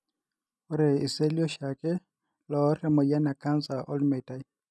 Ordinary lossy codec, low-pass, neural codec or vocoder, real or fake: none; none; none; real